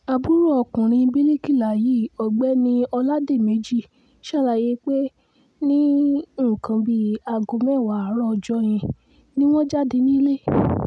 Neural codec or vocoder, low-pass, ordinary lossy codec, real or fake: none; none; none; real